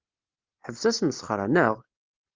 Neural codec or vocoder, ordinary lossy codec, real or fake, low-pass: none; Opus, 32 kbps; real; 7.2 kHz